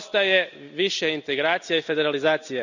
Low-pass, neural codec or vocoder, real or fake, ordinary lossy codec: 7.2 kHz; none; real; none